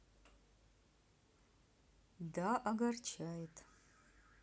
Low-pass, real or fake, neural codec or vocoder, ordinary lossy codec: none; real; none; none